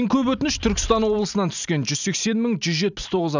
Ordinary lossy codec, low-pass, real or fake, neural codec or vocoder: none; 7.2 kHz; real; none